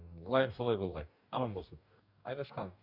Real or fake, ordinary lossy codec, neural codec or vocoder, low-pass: fake; none; codec, 44.1 kHz, 2.6 kbps, DAC; 5.4 kHz